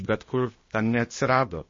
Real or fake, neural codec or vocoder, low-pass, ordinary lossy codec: fake; codec, 16 kHz, 0.8 kbps, ZipCodec; 7.2 kHz; MP3, 32 kbps